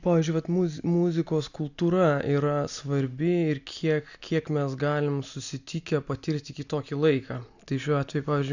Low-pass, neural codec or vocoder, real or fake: 7.2 kHz; none; real